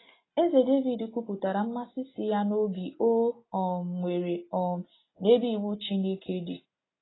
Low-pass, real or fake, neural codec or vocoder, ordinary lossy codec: 7.2 kHz; real; none; AAC, 16 kbps